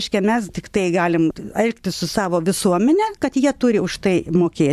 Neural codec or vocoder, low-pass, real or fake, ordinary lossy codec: vocoder, 44.1 kHz, 128 mel bands every 512 samples, BigVGAN v2; 14.4 kHz; fake; AAC, 96 kbps